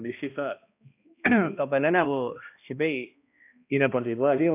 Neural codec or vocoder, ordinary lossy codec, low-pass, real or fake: codec, 16 kHz, 1 kbps, X-Codec, HuBERT features, trained on balanced general audio; none; 3.6 kHz; fake